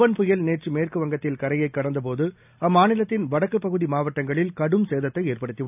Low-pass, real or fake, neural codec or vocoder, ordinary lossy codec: 3.6 kHz; real; none; none